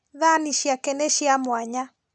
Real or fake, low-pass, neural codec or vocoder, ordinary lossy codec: real; none; none; none